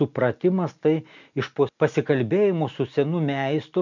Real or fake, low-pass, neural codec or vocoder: real; 7.2 kHz; none